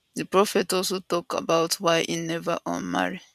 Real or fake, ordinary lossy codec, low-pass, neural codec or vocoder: fake; none; 14.4 kHz; vocoder, 44.1 kHz, 128 mel bands every 512 samples, BigVGAN v2